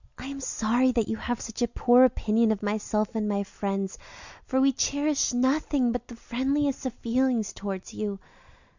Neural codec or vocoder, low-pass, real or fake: none; 7.2 kHz; real